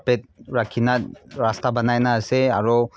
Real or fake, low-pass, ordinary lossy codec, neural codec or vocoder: real; none; none; none